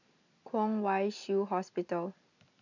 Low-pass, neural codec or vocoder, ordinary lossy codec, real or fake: 7.2 kHz; none; none; real